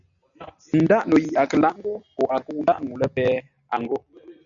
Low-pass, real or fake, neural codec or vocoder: 7.2 kHz; real; none